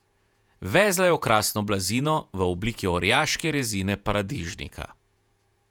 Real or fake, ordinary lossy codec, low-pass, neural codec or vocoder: fake; none; 19.8 kHz; vocoder, 48 kHz, 128 mel bands, Vocos